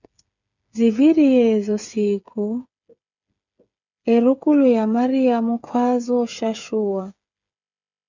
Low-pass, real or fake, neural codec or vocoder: 7.2 kHz; fake; codec, 16 kHz, 8 kbps, FreqCodec, smaller model